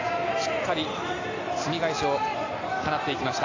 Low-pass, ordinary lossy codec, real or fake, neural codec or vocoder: 7.2 kHz; AAC, 48 kbps; real; none